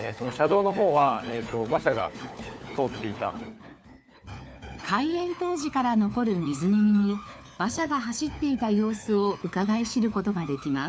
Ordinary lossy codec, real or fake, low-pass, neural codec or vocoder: none; fake; none; codec, 16 kHz, 4 kbps, FunCodec, trained on LibriTTS, 50 frames a second